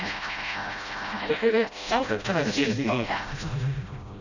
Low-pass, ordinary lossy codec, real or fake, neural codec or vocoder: 7.2 kHz; none; fake; codec, 16 kHz, 0.5 kbps, FreqCodec, smaller model